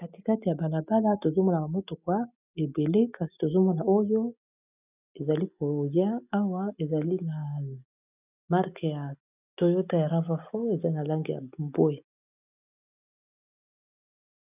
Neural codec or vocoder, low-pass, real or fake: none; 3.6 kHz; real